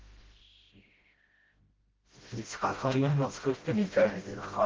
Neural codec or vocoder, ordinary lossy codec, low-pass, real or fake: codec, 16 kHz, 0.5 kbps, FreqCodec, smaller model; Opus, 16 kbps; 7.2 kHz; fake